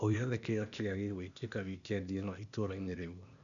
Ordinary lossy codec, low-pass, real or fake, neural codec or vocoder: none; 7.2 kHz; fake; codec, 16 kHz, 0.8 kbps, ZipCodec